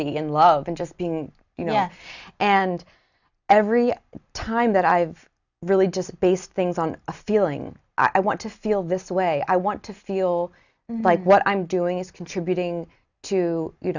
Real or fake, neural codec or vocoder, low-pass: real; none; 7.2 kHz